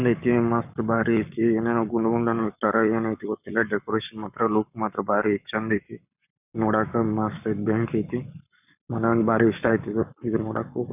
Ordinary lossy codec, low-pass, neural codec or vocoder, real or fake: none; 3.6 kHz; codec, 44.1 kHz, 7.8 kbps, Pupu-Codec; fake